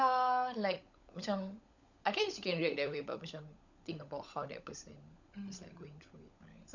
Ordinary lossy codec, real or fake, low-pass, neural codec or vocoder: none; fake; 7.2 kHz; codec, 16 kHz, 16 kbps, FunCodec, trained on LibriTTS, 50 frames a second